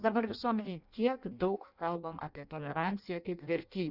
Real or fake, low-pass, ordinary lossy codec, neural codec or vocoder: fake; 5.4 kHz; Opus, 64 kbps; codec, 16 kHz in and 24 kHz out, 0.6 kbps, FireRedTTS-2 codec